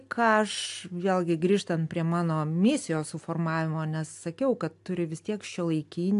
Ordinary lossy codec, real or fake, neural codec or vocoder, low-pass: AAC, 64 kbps; real; none; 10.8 kHz